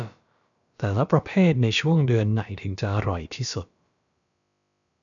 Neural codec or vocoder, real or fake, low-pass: codec, 16 kHz, about 1 kbps, DyCAST, with the encoder's durations; fake; 7.2 kHz